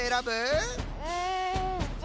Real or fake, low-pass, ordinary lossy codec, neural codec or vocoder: real; none; none; none